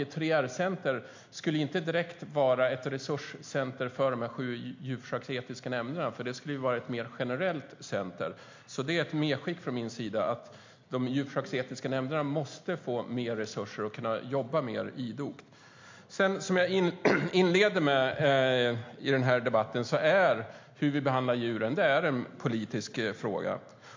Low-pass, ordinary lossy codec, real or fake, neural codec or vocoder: 7.2 kHz; MP3, 48 kbps; real; none